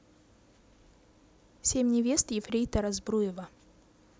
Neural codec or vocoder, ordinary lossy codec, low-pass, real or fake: none; none; none; real